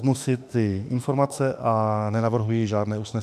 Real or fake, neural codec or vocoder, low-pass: fake; autoencoder, 48 kHz, 32 numbers a frame, DAC-VAE, trained on Japanese speech; 14.4 kHz